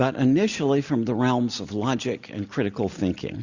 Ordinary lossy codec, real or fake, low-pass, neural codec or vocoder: Opus, 64 kbps; real; 7.2 kHz; none